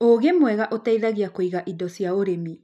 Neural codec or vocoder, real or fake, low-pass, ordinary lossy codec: none; real; 14.4 kHz; none